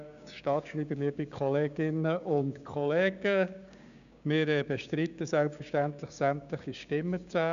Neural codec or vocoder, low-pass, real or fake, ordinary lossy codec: codec, 16 kHz, 6 kbps, DAC; 7.2 kHz; fake; none